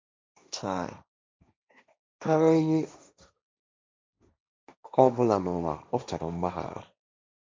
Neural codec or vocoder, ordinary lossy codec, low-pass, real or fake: codec, 16 kHz, 1.1 kbps, Voila-Tokenizer; none; none; fake